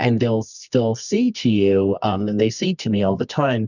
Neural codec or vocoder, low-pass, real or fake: codec, 24 kHz, 0.9 kbps, WavTokenizer, medium music audio release; 7.2 kHz; fake